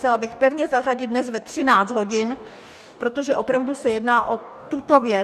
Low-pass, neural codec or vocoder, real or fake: 14.4 kHz; codec, 44.1 kHz, 2.6 kbps, DAC; fake